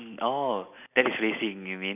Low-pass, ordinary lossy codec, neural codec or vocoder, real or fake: 3.6 kHz; none; none; real